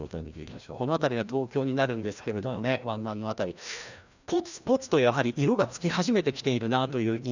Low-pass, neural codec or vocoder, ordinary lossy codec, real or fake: 7.2 kHz; codec, 16 kHz, 1 kbps, FreqCodec, larger model; none; fake